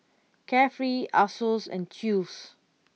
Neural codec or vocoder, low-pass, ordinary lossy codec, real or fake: none; none; none; real